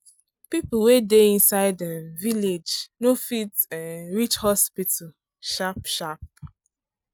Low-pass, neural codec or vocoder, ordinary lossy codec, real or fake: none; none; none; real